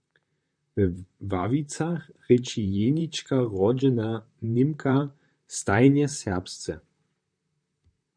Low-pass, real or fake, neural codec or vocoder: 9.9 kHz; fake; vocoder, 44.1 kHz, 128 mel bands, Pupu-Vocoder